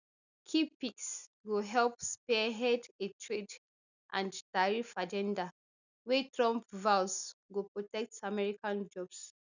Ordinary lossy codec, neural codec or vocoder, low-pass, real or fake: none; none; 7.2 kHz; real